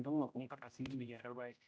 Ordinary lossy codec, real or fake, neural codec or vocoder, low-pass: none; fake; codec, 16 kHz, 0.5 kbps, X-Codec, HuBERT features, trained on general audio; none